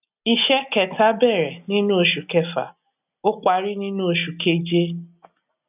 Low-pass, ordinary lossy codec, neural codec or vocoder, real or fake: 3.6 kHz; none; none; real